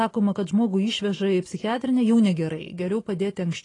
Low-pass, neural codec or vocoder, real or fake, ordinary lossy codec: 10.8 kHz; none; real; AAC, 32 kbps